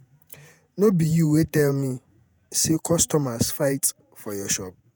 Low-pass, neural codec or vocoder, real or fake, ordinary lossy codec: none; vocoder, 48 kHz, 128 mel bands, Vocos; fake; none